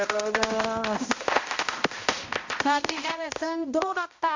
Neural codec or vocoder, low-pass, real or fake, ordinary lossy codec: codec, 16 kHz, 0.5 kbps, X-Codec, HuBERT features, trained on balanced general audio; 7.2 kHz; fake; MP3, 48 kbps